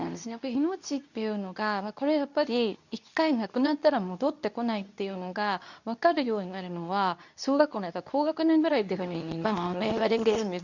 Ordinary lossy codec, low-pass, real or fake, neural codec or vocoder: none; 7.2 kHz; fake; codec, 24 kHz, 0.9 kbps, WavTokenizer, medium speech release version 2